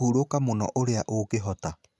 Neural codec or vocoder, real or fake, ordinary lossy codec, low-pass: none; real; none; none